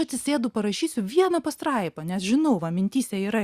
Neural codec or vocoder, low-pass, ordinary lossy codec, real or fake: none; 14.4 kHz; Opus, 64 kbps; real